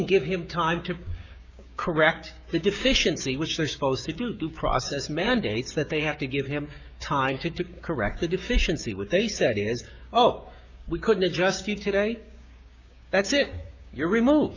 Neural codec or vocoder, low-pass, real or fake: codec, 16 kHz, 6 kbps, DAC; 7.2 kHz; fake